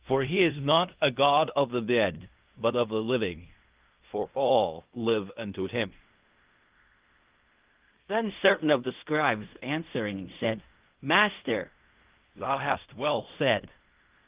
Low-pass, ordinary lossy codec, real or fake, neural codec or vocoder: 3.6 kHz; Opus, 32 kbps; fake; codec, 16 kHz in and 24 kHz out, 0.4 kbps, LongCat-Audio-Codec, fine tuned four codebook decoder